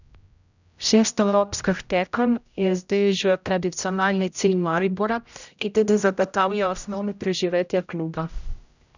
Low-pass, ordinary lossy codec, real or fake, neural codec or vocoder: 7.2 kHz; none; fake; codec, 16 kHz, 0.5 kbps, X-Codec, HuBERT features, trained on general audio